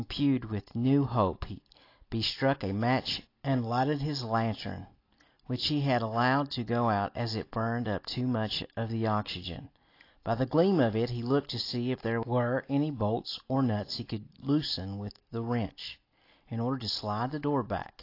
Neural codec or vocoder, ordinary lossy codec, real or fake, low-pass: none; AAC, 32 kbps; real; 5.4 kHz